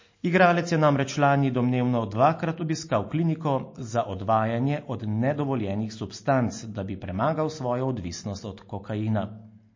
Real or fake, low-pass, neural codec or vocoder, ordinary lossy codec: real; 7.2 kHz; none; MP3, 32 kbps